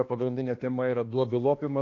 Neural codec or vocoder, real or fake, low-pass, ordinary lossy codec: codec, 16 kHz, 2 kbps, X-Codec, HuBERT features, trained on balanced general audio; fake; 7.2 kHz; AAC, 32 kbps